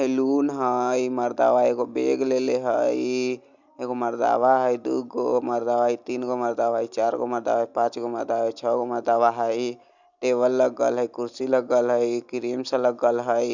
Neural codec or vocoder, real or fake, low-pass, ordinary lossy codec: none; real; 7.2 kHz; Opus, 64 kbps